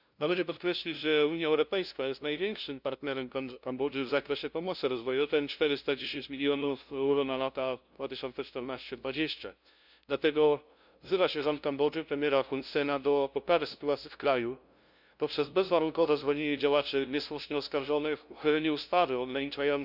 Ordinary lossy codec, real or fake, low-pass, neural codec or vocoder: none; fake; 5.4 kHz; codec, 16 kHz, 0.5 kbps, FunCodec, trained on LibriTTS, 25 frames a second